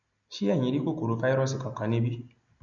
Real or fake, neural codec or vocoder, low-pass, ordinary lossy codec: real; none; 7.2 kHz; none